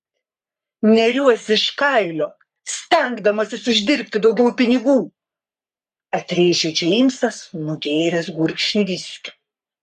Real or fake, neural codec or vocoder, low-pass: fake; codec, 44.1 kHz, 3.4 kbps, Pupu-Codec; 14.4 kHz